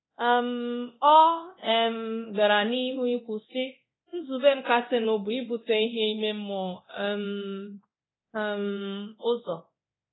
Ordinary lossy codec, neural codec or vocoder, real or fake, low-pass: AAC, 16 kbps; codec, 24 kHz, 0.9 kbps, DualCodec; fake; 7.2 kHz